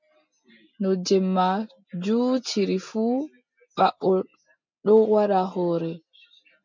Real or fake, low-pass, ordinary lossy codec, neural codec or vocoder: real; 7.2 kHz; MP3, 64 kbps; none